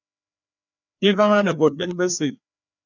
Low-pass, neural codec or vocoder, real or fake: 7.2 kHz; codec, 16 kHz, 2 kbps, FreqCodec, larger model; fake